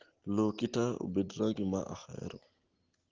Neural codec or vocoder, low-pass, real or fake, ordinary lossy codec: none; 7.2 kHz; real; Opus, 16 kbps